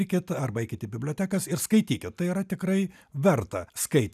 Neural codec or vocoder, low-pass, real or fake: none; 14.4 kHz; real